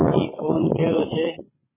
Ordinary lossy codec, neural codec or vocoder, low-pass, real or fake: MP3, 16 kbps; vocoder, 22.05 kHz, 80 mel bands, Vocos; 3.6 kHz; fake